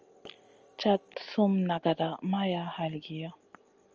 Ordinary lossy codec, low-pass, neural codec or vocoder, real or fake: Opus, 24 kbps; 7.2 kHz; none; real